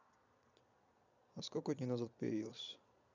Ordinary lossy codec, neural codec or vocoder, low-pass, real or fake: none; vocoder, 22.05 kHz, 80 mel bands, Vocos; 7.2 kHz; fake